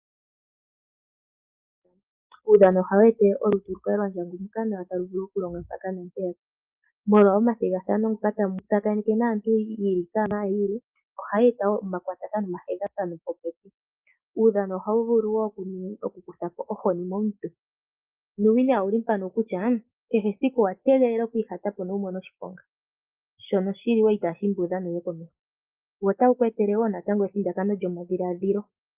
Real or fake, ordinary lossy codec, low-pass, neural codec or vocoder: real; Opus, 32 kbps; 3.6 kHz; none